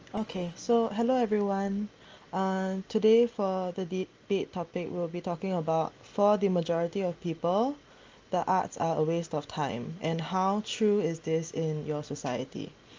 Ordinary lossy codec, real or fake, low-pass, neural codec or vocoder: Opus, 24 kbps; real; 7.2 kHz; none